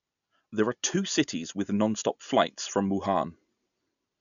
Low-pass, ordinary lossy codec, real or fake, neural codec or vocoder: 7.2 kHz; none; real; none